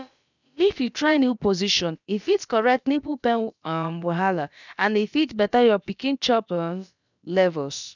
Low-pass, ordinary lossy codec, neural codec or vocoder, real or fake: 7.2 kHz; none; codec, 16 kHz, about 1 kbps, DyCAST, with the encoder's durations; fake